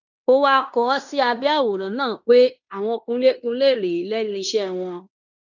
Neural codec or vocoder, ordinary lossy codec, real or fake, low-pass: codec, 16 kHz in and 24 kHz out, 0.9 kbps, LongCat-Audio-Codec, fine tuned four codebook decoder; none; fake; 7.2 kHz